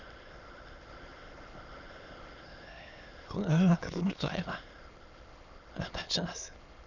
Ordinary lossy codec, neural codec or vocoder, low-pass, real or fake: Opus, 64 kbps; autoencoder, 22.05 kHz, a latent of 192 numbers a frame, VITS, trained on many speakers; 7.2 kHz; fake